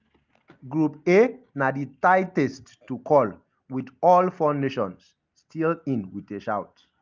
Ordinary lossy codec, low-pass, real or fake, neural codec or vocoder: Opus, 24 kbps; 7.2 kHz; real; none